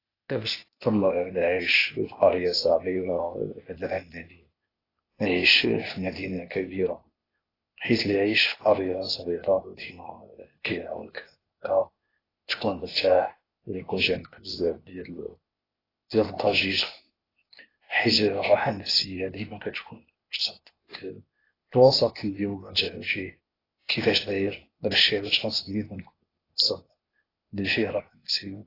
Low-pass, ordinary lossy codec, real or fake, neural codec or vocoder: 5.4 kHz; AAC, 24 kbps; fake; codec, 16 kHz, 0.8 kbps, ZipCodec